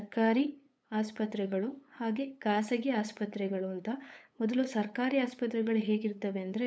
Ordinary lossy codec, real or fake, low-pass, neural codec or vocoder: none; fake; none; codec, 16 kHz, 8 kbps, FunCodec, trained on LibriTTS, 25 frames a second